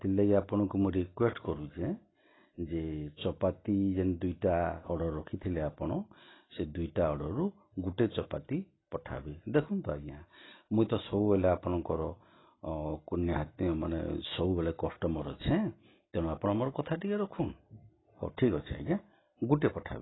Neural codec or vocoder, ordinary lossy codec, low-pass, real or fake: none; AAC, 16 kbps; 7.2 kHz; real